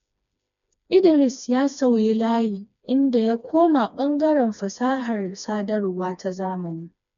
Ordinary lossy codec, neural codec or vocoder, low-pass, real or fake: none; codec, 16 kHz, 2 kbps, FreqCodec, smaller model; 7.2 kHz; fake